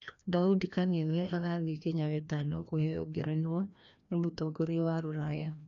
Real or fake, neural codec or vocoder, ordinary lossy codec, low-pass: fake; codec, 16 kHz, 1 kbps, FreqCodec, larger model; none; 7.2 kHz